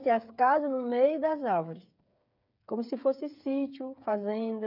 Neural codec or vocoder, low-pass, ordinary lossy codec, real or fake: codec, 16 kHz, 8 kbps, FreqCodec, smaller model; 5.4 kHz; none; fake